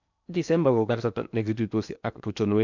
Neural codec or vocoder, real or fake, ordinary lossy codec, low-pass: codec, 16 kHz in and 24 kHz out, 0.8 kbps, FocalCodec, streaming, 65536 codes; fake; none; 7.2 kHz